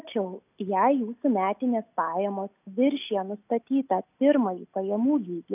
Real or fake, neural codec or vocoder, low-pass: real; none; 3.6 kHz